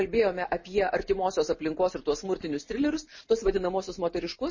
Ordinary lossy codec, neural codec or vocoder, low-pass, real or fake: MP3, 32 kbps; none; 7.2 kHz; real